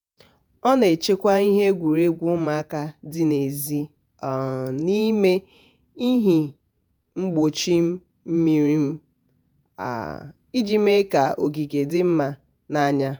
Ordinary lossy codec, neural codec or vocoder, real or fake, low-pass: none; vocoder, 48 kHz, 128 mel bands, Vocos; fake; none